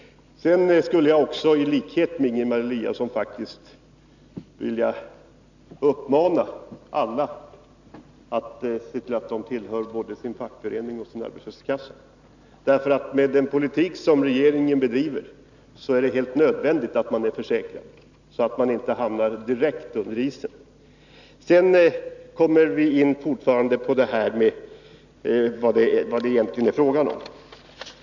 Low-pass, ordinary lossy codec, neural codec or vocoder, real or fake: 7.2 kHz; none; none; real